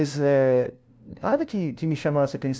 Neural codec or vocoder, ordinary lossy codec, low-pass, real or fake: codec, 16 kHz, 0.5 kbps, FunCodec, trained on LibriTTS, 25 frames a second; none; none; fake